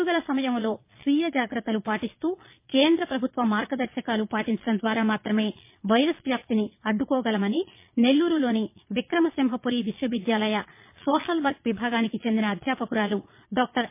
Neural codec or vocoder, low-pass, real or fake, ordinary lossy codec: vocoder, 22.05 kHz, 80 mel bands, Vocos; 3.6 kHz; fake; MP3, 24 kbps